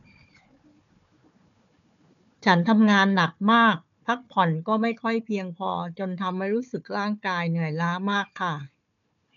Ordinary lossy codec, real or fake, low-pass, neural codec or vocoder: none; fake; 7.2 kHz; codec, 16 kHz, 4 kbps, FunCodec, trained on Chinese and English, 50 frames a second